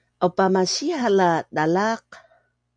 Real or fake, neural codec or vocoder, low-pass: real; none; 9.9 kHz